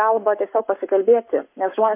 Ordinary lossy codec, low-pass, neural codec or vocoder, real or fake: MP3, 32 kbps; 3.6 kHz; codec, 44.1 kHz, 7.8 kbps, Pupu-Codec; fake